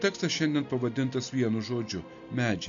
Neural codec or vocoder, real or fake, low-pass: none; real; 7.2 kHz